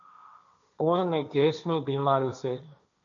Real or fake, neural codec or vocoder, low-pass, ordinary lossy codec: fake; codec, 16 kHz, 1.1 kbps, Voila-Tokenizer; 7.2 kHz; MP3, 96 kbps